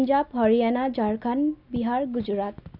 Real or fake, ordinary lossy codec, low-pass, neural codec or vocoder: real; none; 5.4 kHz; none